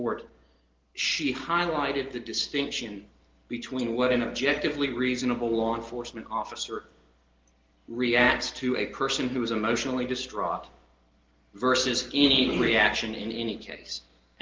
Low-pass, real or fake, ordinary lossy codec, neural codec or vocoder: 7.2 kHz; real; Opus, 16 kbps; none